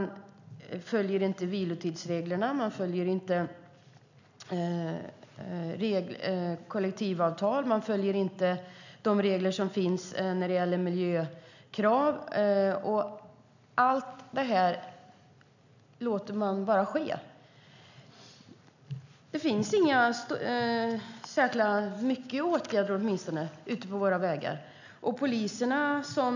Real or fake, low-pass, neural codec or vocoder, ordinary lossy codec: real; 7.2 kHz; none; none